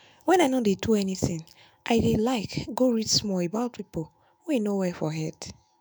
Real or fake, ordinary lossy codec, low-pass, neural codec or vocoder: fake; none; none; autoencoder, 48 kHz, 128 numbers a frame, DAC-VAE, trained on Japanese speech